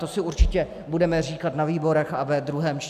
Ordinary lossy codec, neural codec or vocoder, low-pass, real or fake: AAC, 96 kbps; none; 14.4 kHz; real